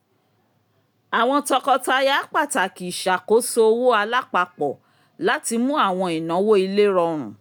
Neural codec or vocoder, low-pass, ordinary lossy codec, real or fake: none; none; none; real